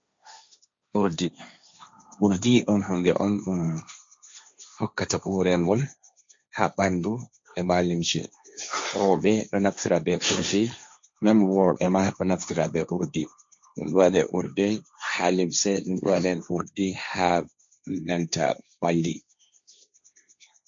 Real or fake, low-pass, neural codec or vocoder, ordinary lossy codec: fake; 7.2 kHz; codec, 16 kHz, 1.1 kbps, Voila-Tokenizer; MP3, 48 kbps